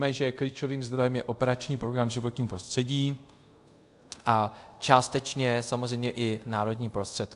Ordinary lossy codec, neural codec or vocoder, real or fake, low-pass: AAC, 64 kbps; codec, 24 kHz, 0.5 kbps, DualCodec; fake; 10.8 kHz